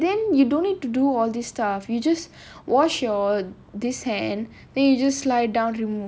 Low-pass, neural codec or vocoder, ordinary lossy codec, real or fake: none; none; none; real